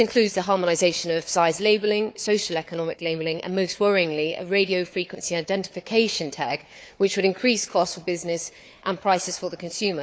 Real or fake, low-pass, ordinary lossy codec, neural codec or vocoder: fake; none; none; codec, 16 kHz, 4 kbps, FunCodec, trained on Chinese and English, 50 frames a second